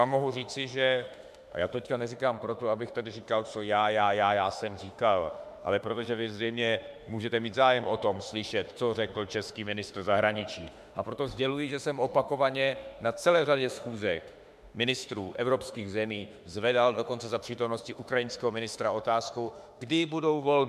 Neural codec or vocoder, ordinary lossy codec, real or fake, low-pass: autoencoder, 48 kHz, 32 numbers a frame, DAC-VAE, trained on Japanese speech; MP3, 96 kbps; fake; 14.4 kHz